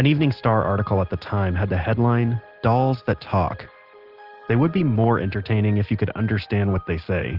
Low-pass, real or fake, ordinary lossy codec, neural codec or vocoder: 5.4 kHz; real; Opus, 16 kbps; none